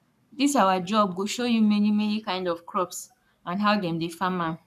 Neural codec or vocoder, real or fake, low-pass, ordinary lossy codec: codec, 44.1 kHz, 7.8 kbps, Pupu-Codec; fake; 14.4 kHz; none